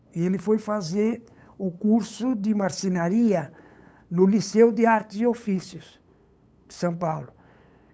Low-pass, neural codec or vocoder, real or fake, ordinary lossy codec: none; codec, 16 kHz, 8 kbps, FunCodec, trained on LibriTTS, 25 frames a second; fake; none